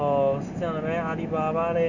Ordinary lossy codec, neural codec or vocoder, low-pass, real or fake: none; none; 7.2 kHz; real